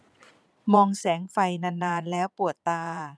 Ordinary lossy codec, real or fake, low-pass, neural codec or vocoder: none; fake; none; vocoder, 22.05 kHz, 80 mel bands, Vocos